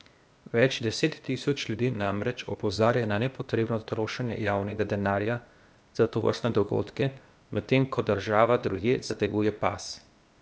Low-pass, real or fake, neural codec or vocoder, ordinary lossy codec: none; fake; codec, 16 kHz, 0.8 kbps, ZipCodec; none